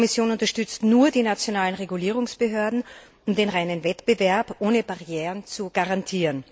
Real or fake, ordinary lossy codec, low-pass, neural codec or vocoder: real; none; none; none